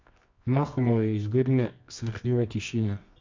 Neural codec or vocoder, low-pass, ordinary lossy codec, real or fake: codec, 24 kHz, 0.9 kbps, WavTokenizer, medium music audio release; 7.2 kHz; MP3, 64 kbps; fake